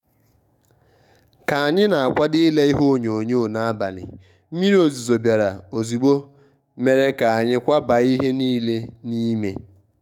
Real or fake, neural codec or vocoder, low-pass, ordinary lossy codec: fake; codec, 44.1 kHz, 7.8 kbps, DAC; 19.8 kHz; none